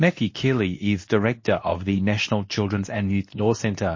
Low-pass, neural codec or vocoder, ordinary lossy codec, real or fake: 7.2 kHz; codec, 24 kHz, 0.9 kbps, WavTokenizer, medium speech release version 2; MP3, 32 kbps; fake